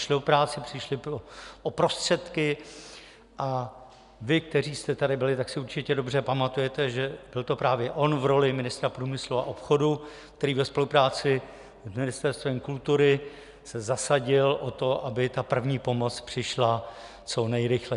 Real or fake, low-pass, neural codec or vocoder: real; 10.8 kHz; none